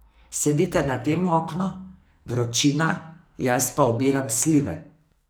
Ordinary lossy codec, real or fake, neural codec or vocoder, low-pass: none; fake; codec, 44.1 kHz, 2.6 kbps, SNAC; none